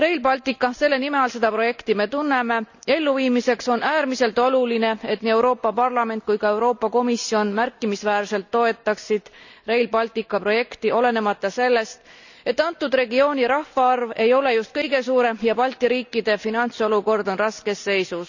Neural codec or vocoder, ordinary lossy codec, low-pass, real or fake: none; none; 7.2 kHz; real